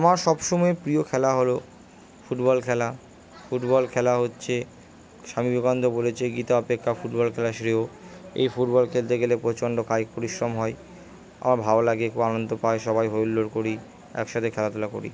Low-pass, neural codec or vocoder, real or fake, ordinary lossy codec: none; none; real; none